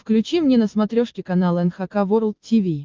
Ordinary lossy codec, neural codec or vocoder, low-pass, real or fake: Opus, 32 kbps; none; 7.2 kHz; real